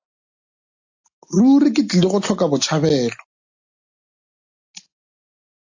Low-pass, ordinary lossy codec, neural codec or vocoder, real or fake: 7.2 kHz; AAC, 48 kbps; none; real